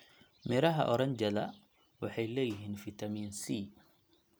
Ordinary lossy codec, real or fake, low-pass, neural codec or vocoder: none; real; none; none